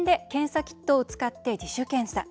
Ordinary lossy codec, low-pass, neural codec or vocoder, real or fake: none; none; none; real